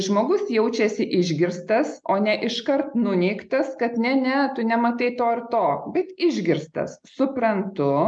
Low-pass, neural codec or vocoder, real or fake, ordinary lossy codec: 9.9 kHz; none; real; MP3, 96 kbps